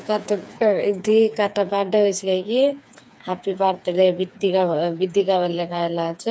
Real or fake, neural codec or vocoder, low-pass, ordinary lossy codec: fake; codec, 16 kHz, 4 kbps, FreqCodec, smaller model; none; none